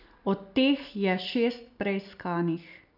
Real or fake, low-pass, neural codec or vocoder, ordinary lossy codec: real; 5.4 kHz; none; none